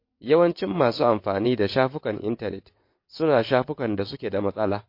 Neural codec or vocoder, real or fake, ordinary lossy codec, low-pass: vocoder, 22.05 kHz, 80 mel bands, WaveNeXt; fake; MP3, 32 kbps; 5.4 kHz